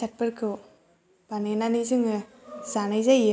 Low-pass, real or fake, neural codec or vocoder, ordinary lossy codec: none; real; none; none